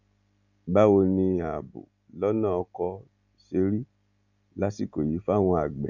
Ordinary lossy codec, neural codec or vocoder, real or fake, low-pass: none; none; real; 7.2 kHz